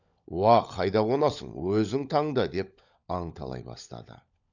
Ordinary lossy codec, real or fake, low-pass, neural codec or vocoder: none; fake; 7.2 kHz; codec, 16 kHz, 16 kbps, FunCodec, trained on LibriTTS, 50 frames a second